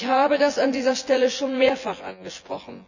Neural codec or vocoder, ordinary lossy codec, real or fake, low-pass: vocoder, 24 kHz, 100 mel bands, Vocos; none; fake; 7.2 kHz